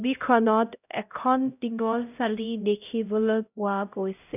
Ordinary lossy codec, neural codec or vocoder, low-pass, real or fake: none; codec, 16 kHz, 0.5 kbps, X-Codec, HuBERT features, trained on LibriSpeech; 3.6 kHz; fake